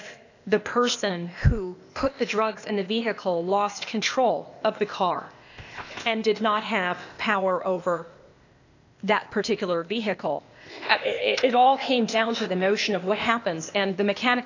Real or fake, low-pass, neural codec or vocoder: fake; 7.2 kHz; codec, 16 kHz, 0.8 kbps, ZipCodec